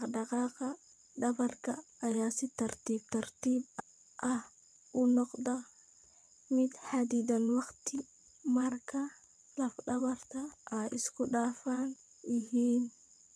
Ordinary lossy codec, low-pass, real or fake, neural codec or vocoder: none; none; fake; vocoder, 22.05 kHz, 80 mel bands, WaveNeXt